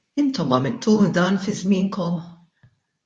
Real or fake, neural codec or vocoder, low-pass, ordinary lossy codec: fake; codec, 24 kHz, 0.9 kbps, WavTokenizer, medium speech release version 2; 10.8 kHz; MP3, 48 kbps